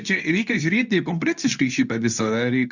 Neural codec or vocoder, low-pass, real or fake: codec, 24 kHz, 0.9 kbps, WavTokenizer, medium speech release version 2; 7.2 kHz; fake